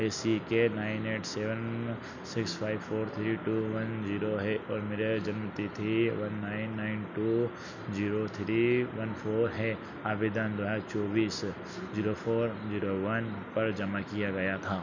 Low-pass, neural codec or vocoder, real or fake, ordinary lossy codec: 7.2 kHz; none; real; none